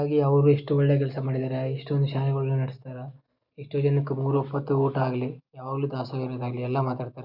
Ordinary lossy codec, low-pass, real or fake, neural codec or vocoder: Opus, 64 kbps; 5.4 kHz; real; none